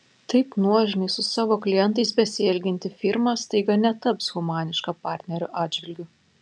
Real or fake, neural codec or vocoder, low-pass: real; none; 9.9 kHz